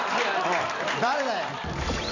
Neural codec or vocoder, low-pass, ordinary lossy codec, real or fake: vocoder, 44.1 kHz, 128 mel bands every 256 samples, BigVGAN v2; 7.2 kHz; none; fake